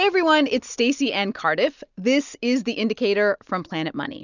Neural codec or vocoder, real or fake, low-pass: none; real; 7.2 kHz